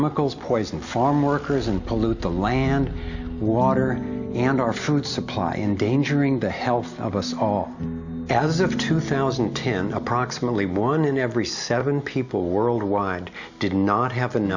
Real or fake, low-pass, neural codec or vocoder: real; 7.2 kHz; none